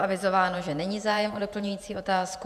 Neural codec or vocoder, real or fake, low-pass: vocoder, 48 kHz, 128 mel bands, Vocos; fake; 14.4 kHz